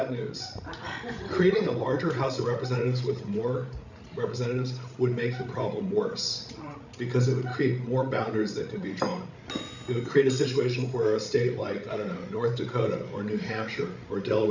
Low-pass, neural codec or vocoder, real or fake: 7.2 kHz; codec, 16 kHz, 16 kbps, FreqCodec, larger model; fake